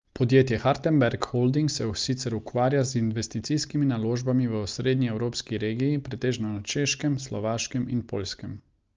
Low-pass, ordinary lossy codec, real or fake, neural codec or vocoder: 7.2 kHz; Opus, 24 kbps; real; none